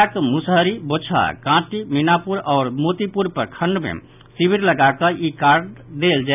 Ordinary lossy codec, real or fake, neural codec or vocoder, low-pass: none; real; none; 3.6 kHz